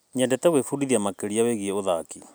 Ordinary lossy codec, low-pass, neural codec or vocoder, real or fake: none; none; none; real